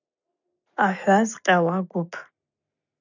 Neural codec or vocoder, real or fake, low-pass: none; real; 7.2 kHz